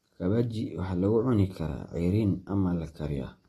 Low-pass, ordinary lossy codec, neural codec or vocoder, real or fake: 19.8 kHz; AAC, 32 kbps; none; real